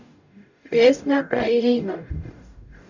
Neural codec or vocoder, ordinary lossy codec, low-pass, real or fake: codec, 44.1 kHz, 0.9 kbps, DAC; none; 7.2 kHz; fake